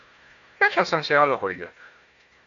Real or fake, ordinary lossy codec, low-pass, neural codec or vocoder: fake; AAC, 48 kbps; 7.2 kHz; codec, 16 kHz, 1 kbps, FunCodec, trained on Chinese and English, 50 frames a second